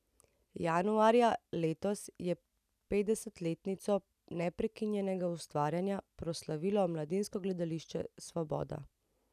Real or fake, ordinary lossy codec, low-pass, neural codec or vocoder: real; none; 14.4 kHz; none